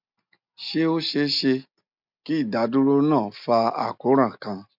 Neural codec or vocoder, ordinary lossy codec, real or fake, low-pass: none; MP3, 48 kbps; real; 5.4 kHz